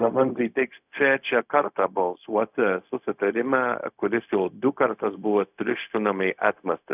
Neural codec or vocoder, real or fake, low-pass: codec, 16 kHz, 0.4 kbps, LongCat-Audio-Codec; fake; 3.6 kHz